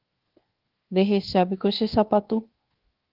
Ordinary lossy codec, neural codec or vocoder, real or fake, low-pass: Opus, 16 kbps; codec, 24 kHz, 1.2 kbps, DualCodec; fake; 5.4 kHz